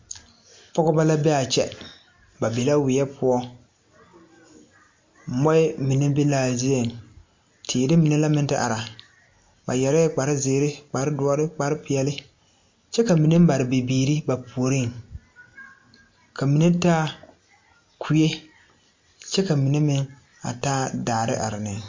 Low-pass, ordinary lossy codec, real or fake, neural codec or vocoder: 7.2 kHz; MP3, 48 kbps; real; none